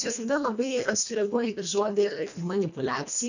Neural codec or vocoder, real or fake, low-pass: codec, 24 kHz, 1.5 kbps, HILCodec; fake; 7.2 kHz